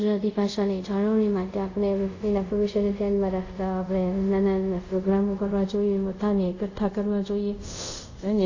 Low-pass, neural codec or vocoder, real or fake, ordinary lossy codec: 7.2 kHz; codec, 24 kHz, 0.5 kbps, DualCodec; fake; AAC, 48 kbps